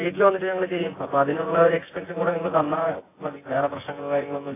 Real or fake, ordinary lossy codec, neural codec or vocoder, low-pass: fake; AAC, 16 kbps; vocoder, 24 kHz, 100 mel bands, Vocos; 3.6 kHz